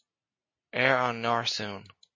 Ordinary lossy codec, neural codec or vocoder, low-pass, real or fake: MP3, 32 kbps; none; 7.2 kHz; real